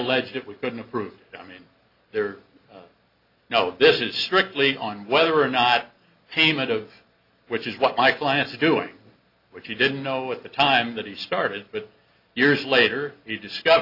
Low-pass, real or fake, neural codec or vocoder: 5.4 kHz; real; none